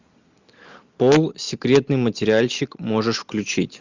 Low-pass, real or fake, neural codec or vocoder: 7.2 kHz; real; none